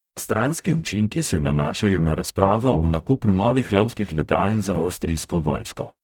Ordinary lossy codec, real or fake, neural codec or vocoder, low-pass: none; fake; codec, 44.1 kHz, 0.9 kbps, DAC; 19.8 kHz